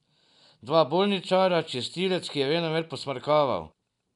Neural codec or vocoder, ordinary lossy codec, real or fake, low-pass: none; none; real; 10.8 kHz